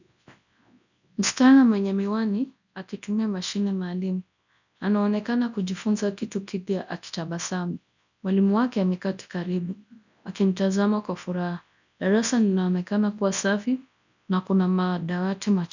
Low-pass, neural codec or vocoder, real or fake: 7.2 kHz; codec, 24 kHz, 0.9 kbps, WavTokenizer, large speech release; fake